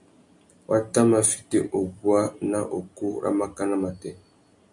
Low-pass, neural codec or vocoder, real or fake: 10.8 kHz; none; real